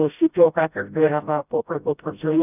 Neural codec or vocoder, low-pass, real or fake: codec, 16 kHz, 0.5 kbps, FreqCodec, smaller model; 3.6 kHz; fake